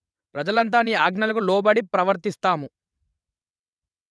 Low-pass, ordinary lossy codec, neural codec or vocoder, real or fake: none; none; vocoder, 22.05 kHz, 80 mel bands, WaveNeXt; fake